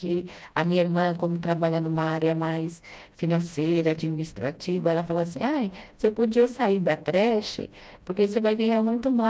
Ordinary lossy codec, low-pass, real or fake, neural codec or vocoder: none; none; fake; codec, 16 kHz, 1 kbps, FreqCodec, smaller model